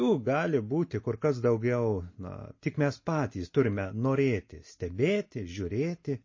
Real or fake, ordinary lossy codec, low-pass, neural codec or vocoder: real; MP3, 32 kbps; 7.2 kHz; none